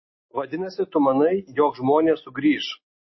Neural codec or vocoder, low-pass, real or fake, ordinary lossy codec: none; 7.2 kHz; real; MP3, 24 kbps